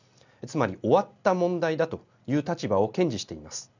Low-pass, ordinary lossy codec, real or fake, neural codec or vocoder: 7.2 kHz; none; real; none